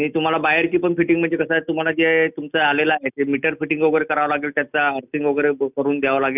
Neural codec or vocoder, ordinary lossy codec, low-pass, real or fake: none; none; 3.6 kHz; real